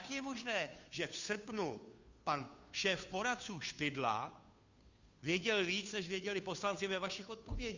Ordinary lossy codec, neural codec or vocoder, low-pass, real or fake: Opus, 64 kbps; codec, 16 kHz, 2 kbps, FunCodec, trained on Chinese and English, 25 frames a second; 7.2 kHz; fake